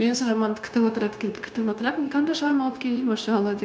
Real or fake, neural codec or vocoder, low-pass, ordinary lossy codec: fake; codec, 16 kHz, 0.9 kbps, LongCat-Audio-Codec; none; none